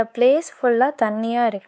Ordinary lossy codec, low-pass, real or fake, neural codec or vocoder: none; none; fake; codec, 16 kHz, 4 kbps, X-Codec, WavLM features, trained on Multilingual LibriSpeech